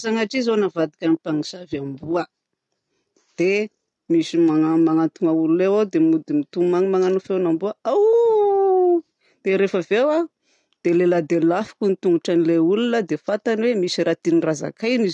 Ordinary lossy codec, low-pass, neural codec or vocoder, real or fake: none; 10.8 kHz; none; real